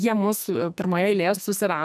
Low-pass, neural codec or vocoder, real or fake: 14.4 kHz; codec, 44.1 kHz, 2.6 kbps, SNAC; fake